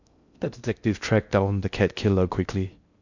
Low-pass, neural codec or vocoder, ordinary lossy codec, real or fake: 7.2 kHz; codec, 16 kHz in and 24 kHz out, 0.6 kbps, FocalCodec, streaming, 2048 codes; none; fake